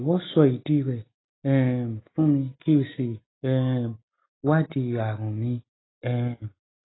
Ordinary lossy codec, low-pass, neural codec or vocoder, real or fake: AAC, 16 kbps; 7.2 kHz; none; real